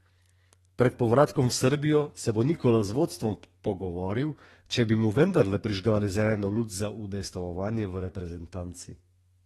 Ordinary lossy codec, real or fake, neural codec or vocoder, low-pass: AAC, 32 kbps; fake; codec, 32 kHz, 1.9 kbps, SNAC; 14.4 kHz